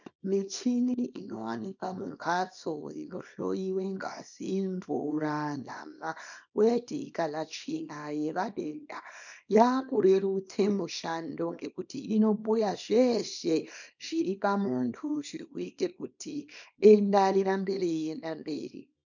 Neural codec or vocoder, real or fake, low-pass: codec, 24 kHz, 0.9 kbps, WavTokenizer, small release; fake; 7.2 kHz